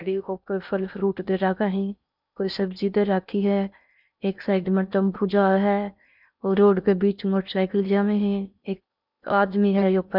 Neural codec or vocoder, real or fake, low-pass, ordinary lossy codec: codec, 16 kHz in and 24 kHz out, 0.6 kbps, FocalCodec, streaming, 2048 codes; fake; 5.4 kHz; none